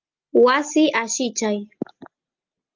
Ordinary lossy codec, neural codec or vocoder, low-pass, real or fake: Opus, 32 kbps; none; 7.2 kHz; real